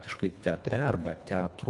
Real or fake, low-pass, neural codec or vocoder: fake; 10.8 kHz; codec, 24 kHz, 1.5 kbps, HILCodec